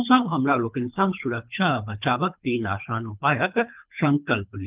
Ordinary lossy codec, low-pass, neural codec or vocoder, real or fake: Opus, 32 kbps; 3.6 kHz; codec, 24 kHz, 3 kbps, HILCodec; fake